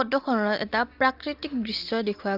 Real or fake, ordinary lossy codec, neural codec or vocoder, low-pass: real; Opus, 24 kbps; none; 5.4 kHz